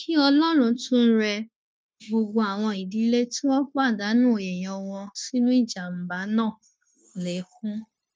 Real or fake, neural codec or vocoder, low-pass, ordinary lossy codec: fake; codec, 16 kHz, 0.9 kbps, LongCat-Audio-Codec; none; none